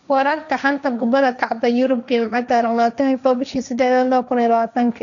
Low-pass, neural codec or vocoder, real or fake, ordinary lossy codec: 7.2 kHz; codec, 16 kHz, 1.1 kbps, Voila-Tokenizer; fake; none